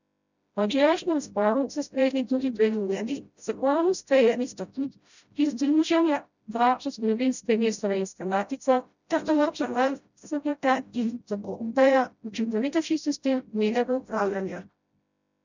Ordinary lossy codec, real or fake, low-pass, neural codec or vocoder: none; fake; 7.2 kHz; codec, 16 kHz, 0.5 kbps, FreqCodec, smaller model